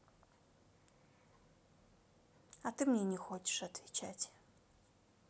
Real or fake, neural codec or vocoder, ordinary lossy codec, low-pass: real; none; none; none